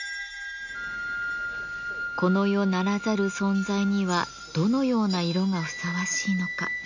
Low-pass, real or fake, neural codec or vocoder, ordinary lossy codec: 7.2 kHz; real; none; none